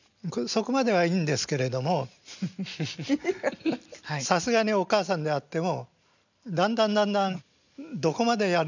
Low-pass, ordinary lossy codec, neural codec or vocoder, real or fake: 7.2 kHz; none; none; real